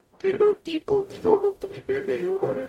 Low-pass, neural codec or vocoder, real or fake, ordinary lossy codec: 19.8 kHz; codec, 44.1 kHz, 0.9 kbps, DAC; fake; MP3, 64 kbps